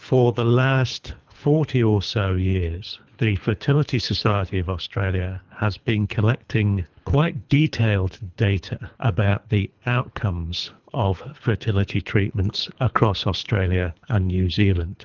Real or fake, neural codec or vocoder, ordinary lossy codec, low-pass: fake; codec, 24 kHz, 3 kbps, HILCodec; Opus, 24 kbps; 7.2 kHz